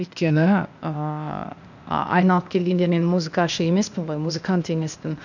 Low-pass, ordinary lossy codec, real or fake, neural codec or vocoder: 7.2 kHz; none; fake; codec, 16 kHz, 0.8 kbps, ZipCodec